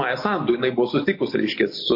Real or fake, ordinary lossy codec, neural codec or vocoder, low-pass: real; MP3, 32 kbps; none; 5.4 kHz